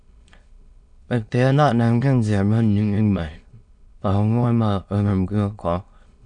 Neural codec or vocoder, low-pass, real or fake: autoencoder, 22.05 kHz, a latent of 192 numbers a frame, VITS, trained on many speakers; 9.9 kHz; fake